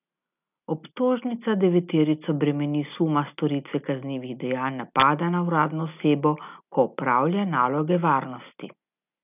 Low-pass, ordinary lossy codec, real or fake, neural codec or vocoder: 3.6 kHz; none; real; none